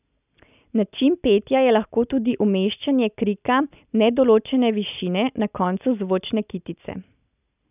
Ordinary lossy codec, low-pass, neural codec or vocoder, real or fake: none; 3.6 kHz; none; real